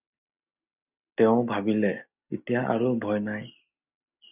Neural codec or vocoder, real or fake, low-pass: none; real; 3.6 kHz